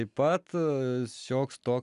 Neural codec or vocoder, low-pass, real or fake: none; 10.8 kHz; real